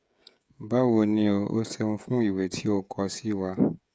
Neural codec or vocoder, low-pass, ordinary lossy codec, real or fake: codec, 16 kHz, 16 kbps, FreqCodec, smaller model; none; none; fake